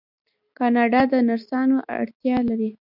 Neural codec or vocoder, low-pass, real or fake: none; 5.4 kHz; real